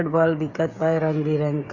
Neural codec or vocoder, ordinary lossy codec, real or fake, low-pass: codec, 16 kHz, 16 kbps, FreqCodec, smaller model; Opus, 64 kbps; fake; 7.2 kHz